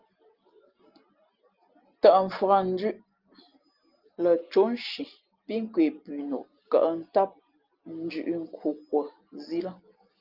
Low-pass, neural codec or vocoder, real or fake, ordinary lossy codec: 5.4 kHz; none; real; Opus, 24 kbps